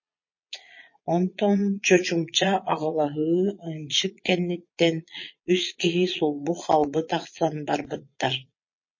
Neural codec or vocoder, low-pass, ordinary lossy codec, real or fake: vocoder, 22.05 kHz, 80 mel bands, Vocos; 7.2 kHz; MP3, 32 kbps; fake